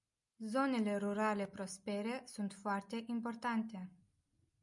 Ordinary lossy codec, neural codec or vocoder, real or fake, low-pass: MP3, 96 kbps; none; real; 10.8 kHz